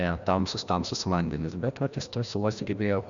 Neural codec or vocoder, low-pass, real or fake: codec, 16 kHz, 1 kbps, FreqCodec, larger model; 7.2 kHz; fake